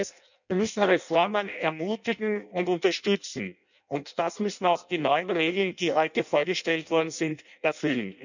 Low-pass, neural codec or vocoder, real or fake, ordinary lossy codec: 7.2 kHz; codec, 16 kHz in and 24 kHz out, 0.6 kbps, FireRedTTS-2 codec; fake; none